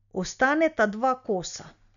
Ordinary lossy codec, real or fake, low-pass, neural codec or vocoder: none; real; 7.2 kHz; none